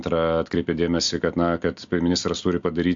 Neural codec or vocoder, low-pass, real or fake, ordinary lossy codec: none; 7.2 kHz; real; AAC, 64 kbps